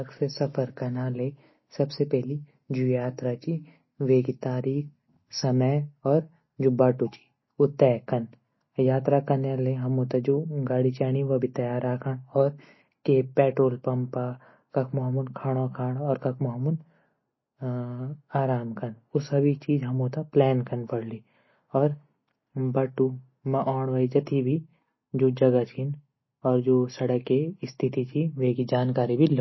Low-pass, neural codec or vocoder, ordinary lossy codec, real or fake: 7.2 kHz; none; MP3, 24 kbps; real